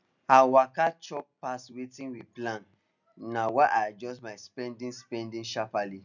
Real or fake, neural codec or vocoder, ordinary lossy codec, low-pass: real; none; none; 7.2 kHz